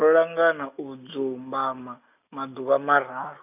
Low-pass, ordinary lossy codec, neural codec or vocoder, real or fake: 3.6 kHz; none; none; real